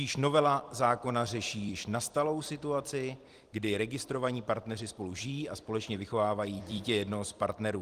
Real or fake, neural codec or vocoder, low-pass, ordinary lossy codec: fake; vocoder, 44.1 kHz, 128 mel bands every 256 samples, BigVGAN v2; 14.4 kHz; Opus, 24 kbps